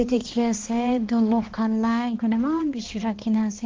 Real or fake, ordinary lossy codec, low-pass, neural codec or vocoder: fake; Opus, 16 kbps; 7.2 kHz; codec, 16 kHz, 2 kbps, X-Codec, HuBERT features, trained on balanced general audio